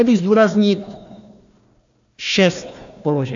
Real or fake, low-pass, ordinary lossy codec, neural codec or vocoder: fake; 7.2 kHz; AAC, 64 kbps; codec, 16 kHz, 1 kbps, FunCodec, trained on Chinese and English, 50 frames a second